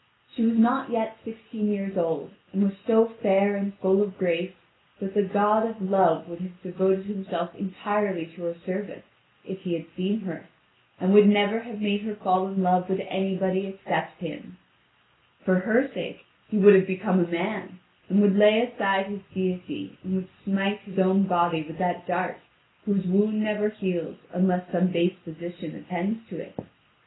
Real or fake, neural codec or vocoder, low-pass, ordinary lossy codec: real; none; 7.2 kHz; AAC, 16 kbps